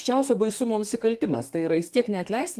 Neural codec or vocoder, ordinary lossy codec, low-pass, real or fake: codec, 32 kHz, 1.9 kbps, SNAC; Opus, 24 kbps; 14.4 kHz; fake